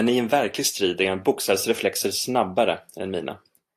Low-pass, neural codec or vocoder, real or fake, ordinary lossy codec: 14.4 kHz; none; real; AAC, 64 kbps